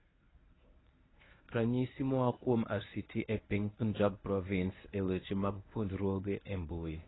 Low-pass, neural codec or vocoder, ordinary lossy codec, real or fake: 7.2 kHz; codec, 24 kHz, 0.9 kbps, WavTokenizer, medium speech release version 1; AAC, 16 kbps; fake